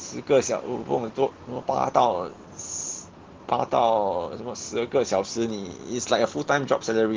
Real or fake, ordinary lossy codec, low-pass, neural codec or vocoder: real; Opus, 16 kbps; 7.2 kHz; none